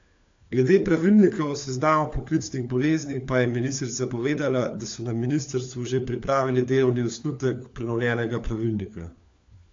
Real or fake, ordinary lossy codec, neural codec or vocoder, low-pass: fake; AAC, 48 kbps; codec, 16 kHz, 4 kbps, FunCodec, trained on LibriTTS, 50 frames a second; 7.2 kHz